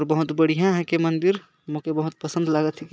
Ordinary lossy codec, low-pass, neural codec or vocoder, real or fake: none; none; none; real